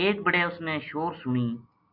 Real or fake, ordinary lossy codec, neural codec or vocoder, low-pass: real; Opus, 64 kbps; none; 5.4 kHz